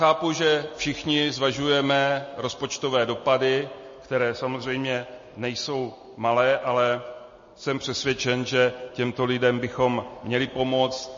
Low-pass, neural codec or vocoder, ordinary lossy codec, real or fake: 7.2 kHz; none; MP3, 32 kbps; real